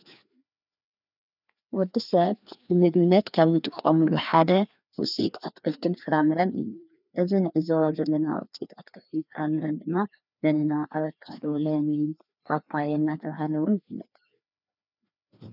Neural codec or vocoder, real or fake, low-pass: codec, 16 kHz, 2 kbps, FreqCodec, larger model; fake; 5.4 kHz